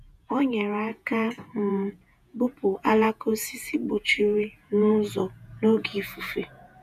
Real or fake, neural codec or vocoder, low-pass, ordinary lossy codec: fake; vocoder, 48 kHz, 128 mel bands, Vocos; 14.4 kHz; none